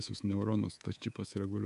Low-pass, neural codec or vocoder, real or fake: 10.8 kHz; codec, 24 kHz, 3.1 kbps, DualCodec; fake